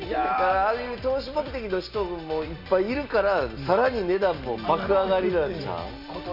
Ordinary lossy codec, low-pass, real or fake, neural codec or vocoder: none; 5.4 kHz; real; none